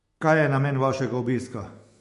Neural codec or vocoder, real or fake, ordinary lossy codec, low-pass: autoencoder, 48 kHz, 128 numbers a frame, DAC-VAE, trained on Japanese speech; fake; MP3, 48 kbps; 14.4 kHz